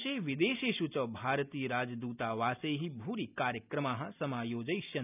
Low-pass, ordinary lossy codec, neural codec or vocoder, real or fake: 3.6 kHz; none; none; real